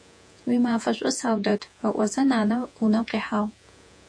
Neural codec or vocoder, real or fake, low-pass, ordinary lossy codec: vocoder, 48 kHz, 128 mel bands, Vocos; fake; 9.9 kHz; AAC, 64 kbps